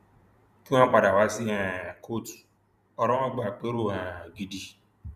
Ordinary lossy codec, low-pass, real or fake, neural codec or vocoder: none; 14.4 kHz; fake; vocoder, 44.1 kHz, 128 mel bands every 512 samples, BigVGAN v2